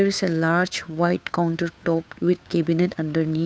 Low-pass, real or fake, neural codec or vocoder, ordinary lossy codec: none; fake; codec, 16 kHz, 2 kbps, FunCodec, trained on Chinese and English, 25 frames a second; none